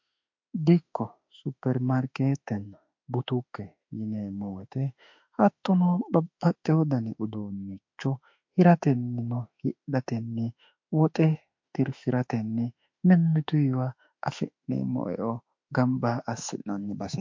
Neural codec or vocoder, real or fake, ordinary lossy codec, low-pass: autoencoder, 48 kHz, 32 numbers a frame, DAC-VAE, trained on Japanese speech; fake; MP3, 48 kbps; 7.2 kHz